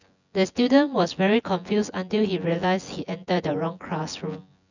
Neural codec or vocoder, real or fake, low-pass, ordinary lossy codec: vocoder, 24 kHz, 100 mel bands, Vocos; fake; 7.2 kHz; none